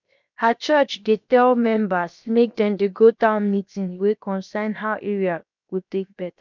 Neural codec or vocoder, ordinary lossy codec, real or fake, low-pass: codec, 16 kHz, 0.7 kbps, FocalCodec; none; fake; 7.2 kHz